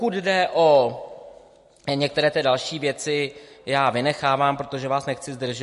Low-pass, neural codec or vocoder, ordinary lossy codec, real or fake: 14.4 kHz; none; MP3, 48 kbps; real